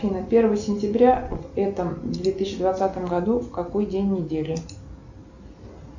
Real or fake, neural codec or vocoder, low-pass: real; none; 7.2 kHz